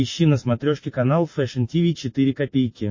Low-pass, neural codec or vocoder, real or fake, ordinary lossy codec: 7.2 kHz; none; real; MP3, 32 kbps